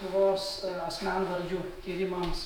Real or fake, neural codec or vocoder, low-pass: fake; autoencoder, 48 kHz, 128 numbers a frame, DAC-VAE, trained on Japanese speech; 19.8 kHz